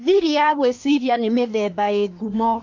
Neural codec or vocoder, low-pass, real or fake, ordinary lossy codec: codec, 24 kHz, 1 kbps, SNAC; 7.2 kHz; fake; MP3, 48 kbps